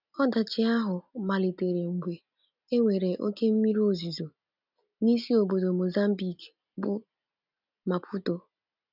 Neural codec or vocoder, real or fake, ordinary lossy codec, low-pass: none; real; none; 5.4 kHz